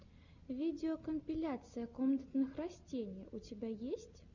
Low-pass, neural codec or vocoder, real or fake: 7.2 kHz; none; real